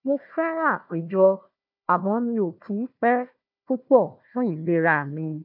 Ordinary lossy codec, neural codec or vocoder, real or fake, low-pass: none; codec, 16 kHz, 1 kbps, FunCodec, trained on Chinese and English, 50 frames a second; fake; 5.4 kHz